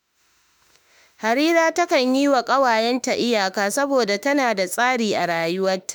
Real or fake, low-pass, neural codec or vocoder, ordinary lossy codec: fake; none; autoencoder, 48 kHz, 32 numbers a frame, DAC-VAE, trained on Japanese speech; none